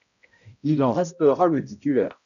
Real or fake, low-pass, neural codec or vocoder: fake; 7.2 kHz; codec, 16 kHz, 0.5 kbps, X-Codec, HuBERT features, trained on balanced general audio